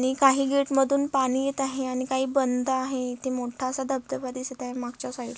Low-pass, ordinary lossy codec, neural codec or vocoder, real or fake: none; none; none; real